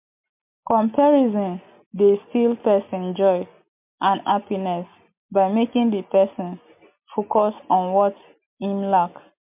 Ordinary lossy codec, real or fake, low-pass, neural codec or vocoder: MP3, 32 kbps; real; 3.6 kHz; none